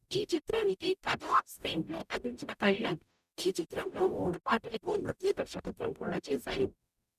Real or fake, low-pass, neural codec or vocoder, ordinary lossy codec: fake; 14.4 kHz; codec, 44.1 kHz, 0.9 kbps, DAC; none